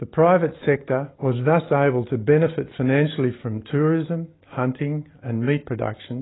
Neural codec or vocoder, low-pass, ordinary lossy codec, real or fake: none; 7.2 kHz; AAC, 16 kbps; real